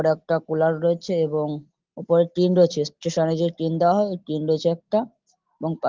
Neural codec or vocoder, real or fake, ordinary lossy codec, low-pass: none; real; Opus, 16 kbps; 7.2 kHz